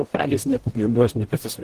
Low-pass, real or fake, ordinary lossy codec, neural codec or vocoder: 14.4 kHz; fake; Opus, 16 kbps; codec, 44.1 kHz, 0.9 kbps, DAC